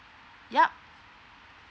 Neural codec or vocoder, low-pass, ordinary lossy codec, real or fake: none; none; none; real